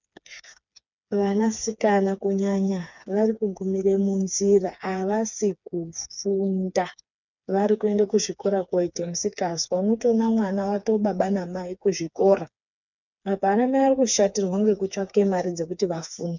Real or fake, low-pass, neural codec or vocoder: fake; 7.2 kHz; codec, 16 kHz, 4 kbps, FreqCodec, smaller model